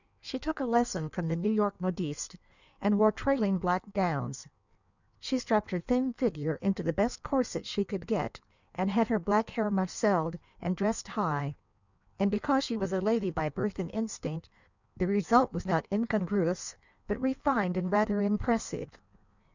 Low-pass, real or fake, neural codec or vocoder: 7.2 kHz; fake; codec, 16 kHz in and 24 kHz out, 1.1 kbps, FireRedTTS-2 codec